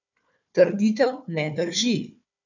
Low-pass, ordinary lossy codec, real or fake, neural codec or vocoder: 7.2 kHz; none; fake; codec, 16 kHz, 4 kbps, FunCodec, trained on Chinese and English, 50 frames a second